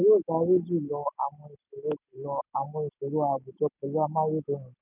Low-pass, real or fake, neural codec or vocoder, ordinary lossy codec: 3.6 kHz; real; none; none